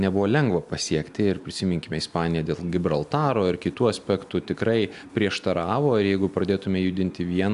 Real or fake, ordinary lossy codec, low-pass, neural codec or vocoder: real; AAC, 96 kbps; 10.8 kHz; none